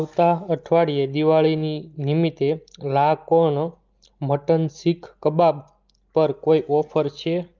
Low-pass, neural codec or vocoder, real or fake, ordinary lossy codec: 7.2 kHz; none; real; Opus, 24 kbps